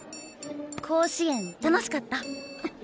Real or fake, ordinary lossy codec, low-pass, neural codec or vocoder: real; none; none; none